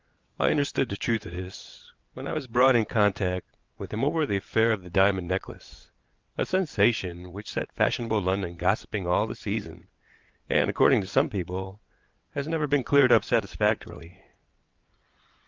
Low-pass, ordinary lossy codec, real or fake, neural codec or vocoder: 7.2 kHz; Opus, 32 kbps; fake; vocoder, 22.05 kHz, 80 mel bands, WaveNeXt